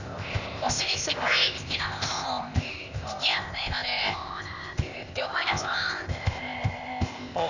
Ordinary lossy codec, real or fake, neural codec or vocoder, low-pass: none; fake; codec, 16 kHz, 0.8 kbps, ZipCodec; 7.2 kHz